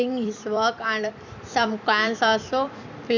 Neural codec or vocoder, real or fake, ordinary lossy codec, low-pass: vocoder, 44.1 kHz, 128 mel bands every 256 samples, BigVGAN v2; fake; none; 7.2 kHz